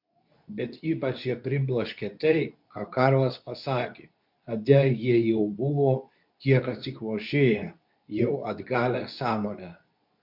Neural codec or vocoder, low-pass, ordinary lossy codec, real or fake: codec, 24 kHz, 0.9 kbps, WavTokenizer, medium speech release version 2; 5.4 kHz; MP3, 48 kbps; fake